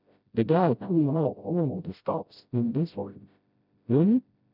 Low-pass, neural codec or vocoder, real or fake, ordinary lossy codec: 5.4 kHz; codec, 16 kHz, 0.5 kbps, FreqCodec, smaller model; fake; none